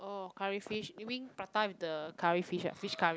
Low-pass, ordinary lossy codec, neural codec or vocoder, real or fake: none; none; none; real